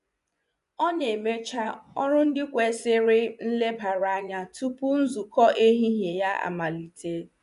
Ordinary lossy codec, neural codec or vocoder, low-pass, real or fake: none; vocoder, 24 kHz, 100 mel bands, Vocos; 10.8 kHz; fake